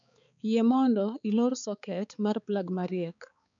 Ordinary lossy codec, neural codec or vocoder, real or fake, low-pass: none; codec, 16 kHz, 4 kbps, X-Codec, HuBERT features, trained on balanced general audio; fake; 7.2 kHz